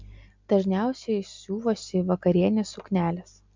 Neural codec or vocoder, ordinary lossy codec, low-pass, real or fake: none; MP3, 64 kbps; 7.2 kHz; real